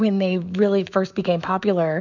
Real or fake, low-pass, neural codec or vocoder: fake; 7.2 kHz; autoencoder, 48 kHz, 128 numbers a frame, DAC-VAE, trained on Japanese speech